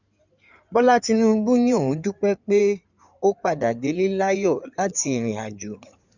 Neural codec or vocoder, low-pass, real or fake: codec, 16 kHz in and 24 kHz out, 2.2 kbps, FireRedTTS-2 codec; 7.2 kHz; fake